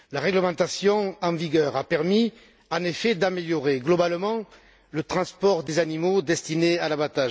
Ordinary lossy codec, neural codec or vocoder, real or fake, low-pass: none; none; real; none